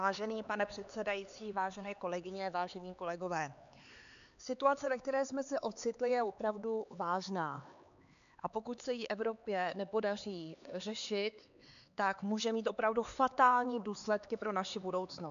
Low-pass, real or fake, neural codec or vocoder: 7.2 kHz; fake; codec, 16 kHz, 4 kbps, X-Codec, HuBERT features, trained on LibriSpeech